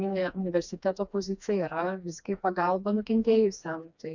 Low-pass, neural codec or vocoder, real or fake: 7.2 kHz; codec, 16 kHz, 2 kbps, FreqCodec, smaller model; fake